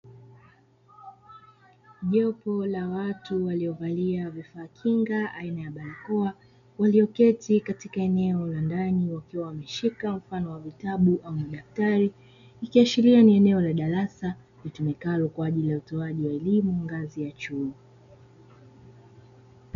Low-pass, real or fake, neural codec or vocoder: 7.2 kHz; real; none